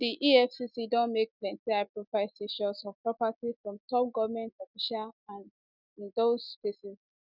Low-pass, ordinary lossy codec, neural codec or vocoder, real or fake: 5.4 kHz; none; none; real